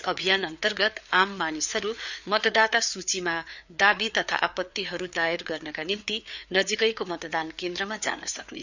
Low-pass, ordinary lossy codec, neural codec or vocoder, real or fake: 7.2 kHz; none; codec, 16 kHz, 4 kbps, FreqCodec, larger model; fake